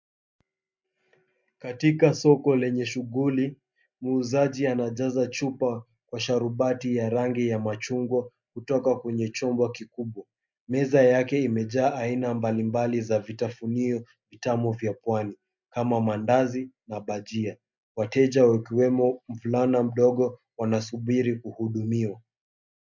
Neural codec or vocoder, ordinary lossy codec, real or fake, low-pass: none; MP3, 64 kbps; real; 7.2 kHz